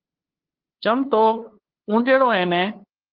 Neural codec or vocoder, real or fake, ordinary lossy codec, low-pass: codec, 16 kHz, 2 kbps, FunCodec, trained on LibriTTS, 25 frames a second; fake; Opus, 16 kbps; 5.4 kHz